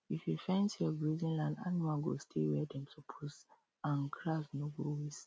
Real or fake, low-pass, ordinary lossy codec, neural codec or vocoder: real; none; none; none